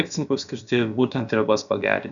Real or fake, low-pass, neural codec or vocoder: fake; 7.2 kHz; codec, 16 kHz, about 1 kbps, DyCAST, with the encoder's durations